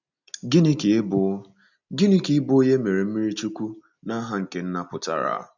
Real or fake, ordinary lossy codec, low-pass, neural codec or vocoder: real; none; 7.2 kHz; none